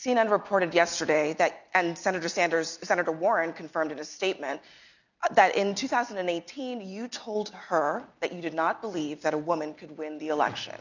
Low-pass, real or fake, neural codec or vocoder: 7.2 kHz; real; none